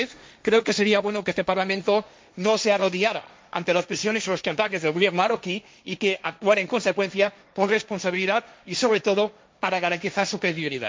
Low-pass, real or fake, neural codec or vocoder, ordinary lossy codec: none; fake; codec, 16 kHz, 1.1 kbps, Voila-Tokenizer; none